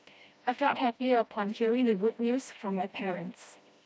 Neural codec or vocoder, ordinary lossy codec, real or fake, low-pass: codec, 16 kHz, 1 kbps, FreqCodec, smaller model; none; fake; none